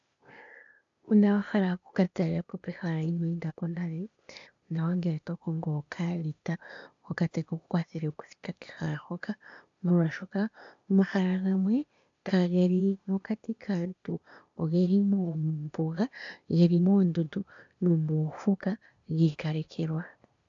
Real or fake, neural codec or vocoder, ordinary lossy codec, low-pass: fake; codec, 16 kHz, 0.8 kbps, ZipCodec; AAC, 64 kbps; 7.2 kHz